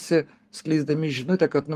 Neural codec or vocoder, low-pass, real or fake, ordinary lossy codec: codec, 44.1 kHz, 7.8 kbps, Pupu-Codec; 14.4 kHz; fake; Opus, 24 kbps